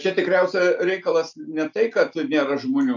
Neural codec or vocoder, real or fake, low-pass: none; real; 7.2 kHz